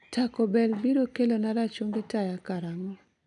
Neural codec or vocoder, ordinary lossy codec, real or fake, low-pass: none; none; real; 10.8 kHz